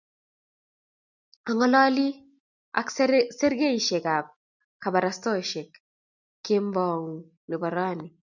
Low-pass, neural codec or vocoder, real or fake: 7.2 kHz; none; real